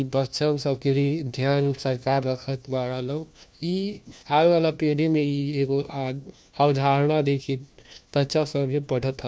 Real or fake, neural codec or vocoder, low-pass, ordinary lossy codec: fake; codec, 16 kHz, 1 kbps, FunCodec, trained on LibriTTS, 50 frames a second; none; none